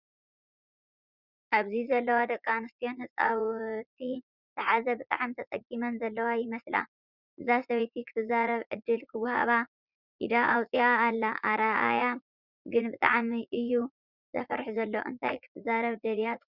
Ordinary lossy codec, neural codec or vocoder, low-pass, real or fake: Opus, 64 kbps; vocoder, 44.1 kHz, 80 mel bands, Vocos; 5.4 kHz; fake